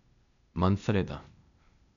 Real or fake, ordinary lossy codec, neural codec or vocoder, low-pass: fake; none; codec, 16 kHz, 0.8 kbps, ZipCodec; 7.2 kHz